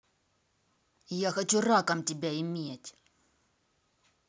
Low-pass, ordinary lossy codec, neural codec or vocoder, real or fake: none; none; none; real